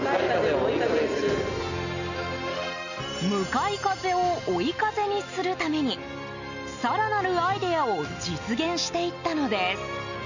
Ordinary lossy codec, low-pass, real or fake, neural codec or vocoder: none; 7.2 kHz; real; none